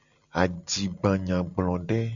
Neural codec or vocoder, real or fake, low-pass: none; real; 7.2 kHz